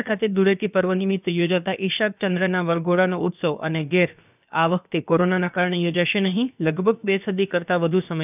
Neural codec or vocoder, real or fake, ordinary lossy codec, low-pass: codec, 16 kHz, about 1 kbps, DyCAST, with the encoder's durations; fake; none; 3.6 kHz